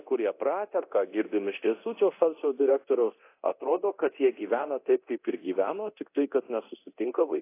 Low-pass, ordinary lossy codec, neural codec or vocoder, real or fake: 3.6 kHz; AAC, 24 kbps; codec, 24 kHz, 0.9 kbps, DualCodec; fake